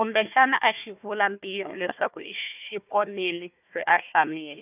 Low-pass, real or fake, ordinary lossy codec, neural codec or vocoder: 3.6 kHz; fake; none; codec, 16 kHz, 1 kbps, FunCodec, trained on Chinese and English, 50 frames a second